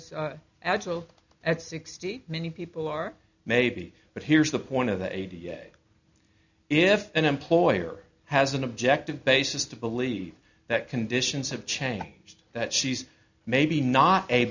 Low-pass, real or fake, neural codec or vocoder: 7.2 kHz; real; none